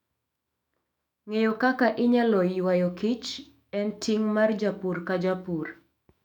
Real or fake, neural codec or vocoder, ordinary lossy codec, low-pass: fake; autoencoder, 48 kHz, 128 numbers a frame, DAC-VAE, trained on Japanese speech; none; 19.8 kHz